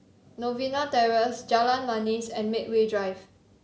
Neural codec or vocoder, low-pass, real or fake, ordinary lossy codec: none; none; real; none